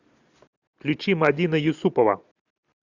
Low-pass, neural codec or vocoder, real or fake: 7.2 kHz; none; real